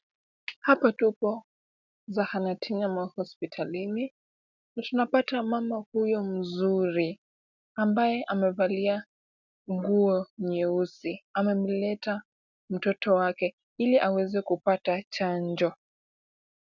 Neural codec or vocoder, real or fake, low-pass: none; real; 7.2 kHz